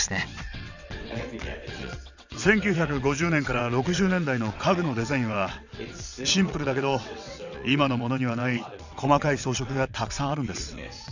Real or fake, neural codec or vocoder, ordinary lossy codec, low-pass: fake; vocoder, 22.05 kHz, 80 mel bands, WaveNeXt; none; 7.2 kHz